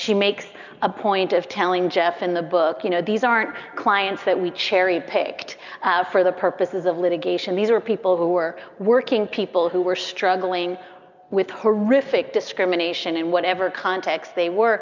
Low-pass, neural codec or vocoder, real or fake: 7.2 kHz; none; real